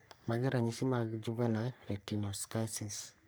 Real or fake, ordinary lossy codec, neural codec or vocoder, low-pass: fake; none; codec, 44.1 kHz, 3.4 kbps, Pupu-Codec; none